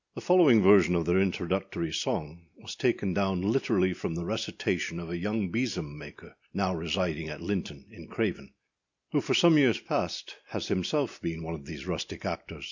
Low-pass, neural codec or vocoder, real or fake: 7.2 kHz; none; real